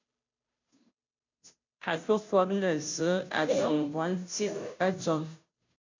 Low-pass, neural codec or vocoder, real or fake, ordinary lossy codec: 7.2 kHz; codec, 16 kHz, 0.5 kbps, FunCodec, trained on Chinese and English, 25 frames a second; fake; MP3, 64 kbps